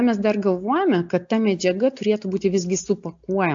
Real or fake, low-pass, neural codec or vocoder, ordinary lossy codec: real; 7.2 kHz; none; AAC, 64 kbps